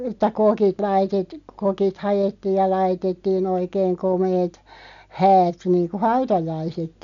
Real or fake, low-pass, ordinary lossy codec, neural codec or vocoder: real; 7.2 kHz; none; none